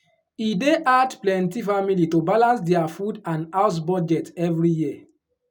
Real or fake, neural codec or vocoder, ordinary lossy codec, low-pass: real; none; none; 19.8 kHz